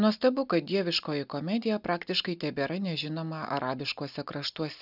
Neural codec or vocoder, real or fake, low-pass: none; real; 5.4 kHz